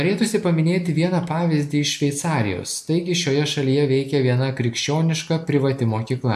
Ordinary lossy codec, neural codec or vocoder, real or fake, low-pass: AAC, 96 kbps; none; real; 14.4 kHz